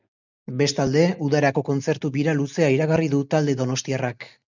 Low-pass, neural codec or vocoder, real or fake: 7.2 kHz; none; real